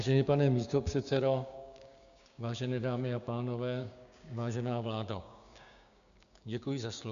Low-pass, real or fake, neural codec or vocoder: 7.2 kHz; fake; codec, 16 kHz, 6 kbps, DAC